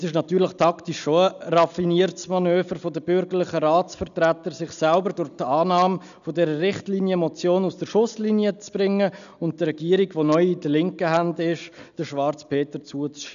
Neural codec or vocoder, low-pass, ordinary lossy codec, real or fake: none; 7.2 kHz; none; real